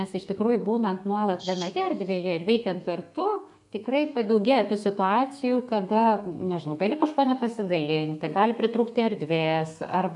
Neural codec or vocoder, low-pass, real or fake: codec, 32 kHz, 1.9 kbps, SNAC; 10.8 kHz; fake